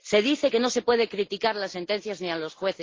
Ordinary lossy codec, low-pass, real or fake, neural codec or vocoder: Opus, 16 kbps; 7.2 kHz; real; none